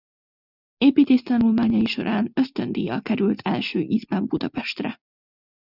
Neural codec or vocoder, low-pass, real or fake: none; 5.4 kHz; real